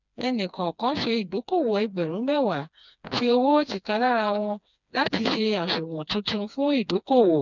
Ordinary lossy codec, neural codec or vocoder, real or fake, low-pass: none; codec, 16 kHz, 2 kbps, FreqCodec, smaller model; fake; 7.2 kHz